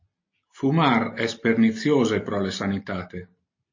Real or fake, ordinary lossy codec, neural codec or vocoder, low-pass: real; MP3, 32 kbps; none; 7.2 kHz